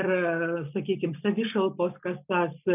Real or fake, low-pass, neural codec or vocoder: real; 3.6 kHz; none